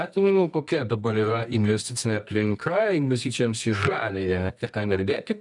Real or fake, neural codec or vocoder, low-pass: fake; codec, 24 kHz, 0.9 kbps, WavTokenizer, medium music audio release; 10.8 kHz